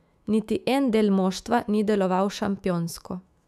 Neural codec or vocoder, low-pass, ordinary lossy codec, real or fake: autoencoder, 48 kHz, 128 numbers a frame, DAC-VAE, trained on Japanese speech; 14.4 kHz; none; fake